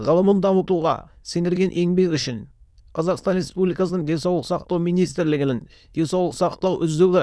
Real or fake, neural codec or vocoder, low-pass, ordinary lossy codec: fake; autoencoder, 22.05 kHz, a latent of 192 numbers a frame, VITS, trained on many speakers; none; none